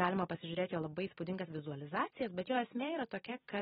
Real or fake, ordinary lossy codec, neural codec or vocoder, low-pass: fake; AAC, 16 kbps; vocoder, 44.1 kHz, 128 mel bands every 256 samples, BigVGAN v2; 19.8 kHz